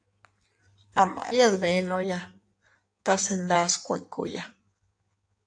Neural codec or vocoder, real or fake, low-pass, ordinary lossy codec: codec, 16 kHz in and 24 kHz out, 1.1 kbps, FireRedTTS-2 codec; fake; 9.9 kHz; AAC, 64 kbps